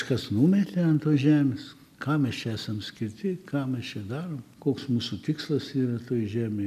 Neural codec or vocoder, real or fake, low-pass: none; real; 14.4 kHz